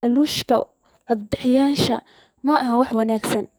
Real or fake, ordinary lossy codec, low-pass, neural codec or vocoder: fake; none; none; codec, 44.1 kHz, 2.6 kbps, SNAC